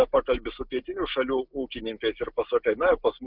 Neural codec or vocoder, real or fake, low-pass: none; real; 5.4 kHz